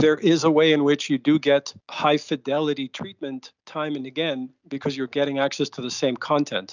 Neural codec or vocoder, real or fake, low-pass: none; real; 7.2 kHz